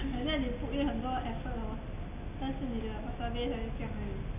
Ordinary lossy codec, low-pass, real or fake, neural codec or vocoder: none; 3.6 kHz; real; none